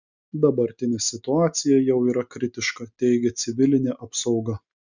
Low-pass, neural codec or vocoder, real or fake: 7.2 kHz; none; real